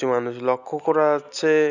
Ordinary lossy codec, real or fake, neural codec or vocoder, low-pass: none; real; none; 7.2 kHz